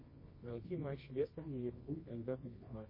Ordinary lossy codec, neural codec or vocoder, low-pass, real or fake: MP3, 24 kbps; codec, 24 kHz, 0.9 kbps, WavTokenizer, medium music audio release; 5.4 kHz; fake